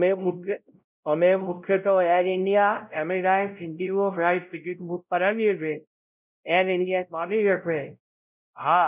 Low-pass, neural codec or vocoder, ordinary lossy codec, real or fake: 3.6 kHz; codec, 16 kHz, 0.5 kbps, X-Codec, WavLM features, trained on Multilingual LibriSpeech; none; fake